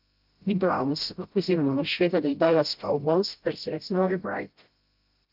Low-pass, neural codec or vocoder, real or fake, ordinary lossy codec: 5.4 kHz; codec, 16 kHz, 0.5 kbps, FreqCodec, smaller model; fake; Opus, 32 kbps